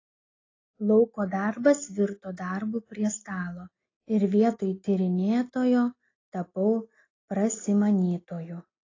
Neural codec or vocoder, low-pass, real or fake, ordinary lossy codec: none; 7.2 kHz; real; AAC, 32 kbps